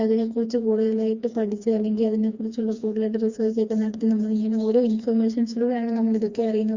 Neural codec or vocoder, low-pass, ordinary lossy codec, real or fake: codec, 16 kHz, 2 kbps, FreqCodec, smaller model; 7.2 kHz; Opus, 64 kbps; fake